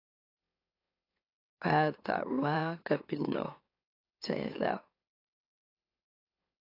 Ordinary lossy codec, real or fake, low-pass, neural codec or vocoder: AAC, 32 kbps; fake; 5.4 kHz; autoencoder, 44.1 kHz, a latent of 192 numbers a frame, MeloTTS